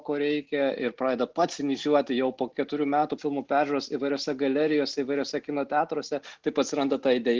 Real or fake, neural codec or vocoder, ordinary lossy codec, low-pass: real; none; Opus, 16 kbps; 7.2 kHz